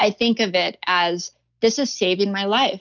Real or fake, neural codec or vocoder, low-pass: real; none; 7.2 kHz